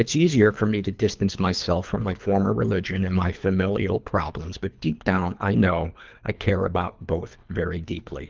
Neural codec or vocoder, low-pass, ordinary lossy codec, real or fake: codec, 24 kHz, 3 kbps, HILCodec; 7.2 kHz; Opus, 24 kbps; fake